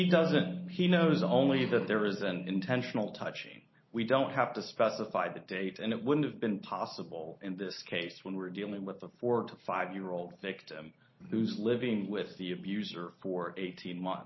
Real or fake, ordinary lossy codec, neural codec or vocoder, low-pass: real; MP3, 24 kbps; none; 7.2 kHz